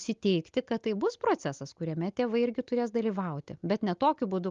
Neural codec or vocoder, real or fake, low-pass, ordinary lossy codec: none; real; 7.2 kHz; Opus, 32 kbps